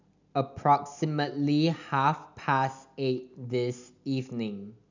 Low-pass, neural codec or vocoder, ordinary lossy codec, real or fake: 7.2 kHz; none; none; real